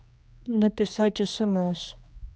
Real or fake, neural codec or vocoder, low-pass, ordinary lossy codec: fake; codec, 16 kHz, 2 kbps, X-Codec, HuBERT features, trained on general audio; none; none